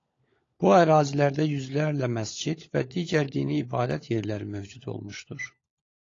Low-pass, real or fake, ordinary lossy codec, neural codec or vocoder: 7.2 kHz; fake; AAC, 32 kbps; codec, 16 kHz, 16 kbps, FunCodec, trained on LibriTTS, 50 frames a second